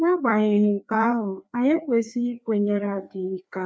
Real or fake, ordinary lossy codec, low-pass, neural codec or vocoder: fake; none; none; codec, 16 kHz, 2 kbps, FreqCodec, larger model